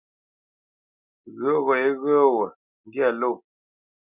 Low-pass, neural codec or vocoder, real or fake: 3.6 kHz; none; real